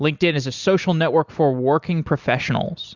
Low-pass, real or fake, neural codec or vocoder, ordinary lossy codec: 7.2 kHz; real; none; Opus, 64 kbps